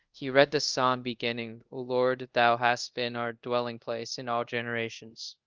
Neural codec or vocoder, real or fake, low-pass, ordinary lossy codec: codec, 24 kHz, 0.5 kbps, DualCodec; fake; 7.2 kHz; Opus, 24 kbps